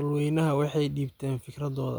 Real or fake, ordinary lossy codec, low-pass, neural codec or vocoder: real; none; none; none